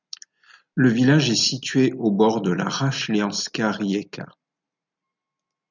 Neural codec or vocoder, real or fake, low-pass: none; real; 7.2 kHz